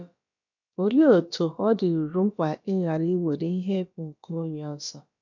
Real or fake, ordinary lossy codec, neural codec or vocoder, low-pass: fake; none; codec, 16 kHz, about 1 kbps, DyCAST, with the encoder's durations; 7.2 kHz